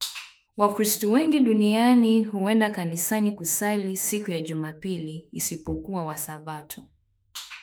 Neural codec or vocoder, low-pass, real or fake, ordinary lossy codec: autoencoder, 48 kHz, 32 numbers a frame, DAC-VAE, trained on Japanese speech; none; fake; none